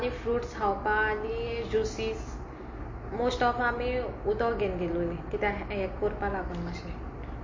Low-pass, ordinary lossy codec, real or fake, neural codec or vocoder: 7.2 kHz; MP3, 32 kbps; real; none